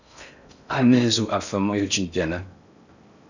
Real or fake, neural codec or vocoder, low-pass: fake; codec, 16 kHz in and 24 kHz out, 0.6 kbps, FocalCodec, streaming, 4096 codes; 7.2 kHz